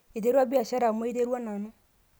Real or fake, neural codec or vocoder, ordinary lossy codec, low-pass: real; none; none; none